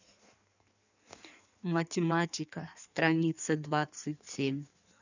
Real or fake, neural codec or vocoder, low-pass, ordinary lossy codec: fake; codec, 16 kHz in and 24 kHz out, 1.1 kbps, FireRedTTS-2 codec; 7.2 kHz; none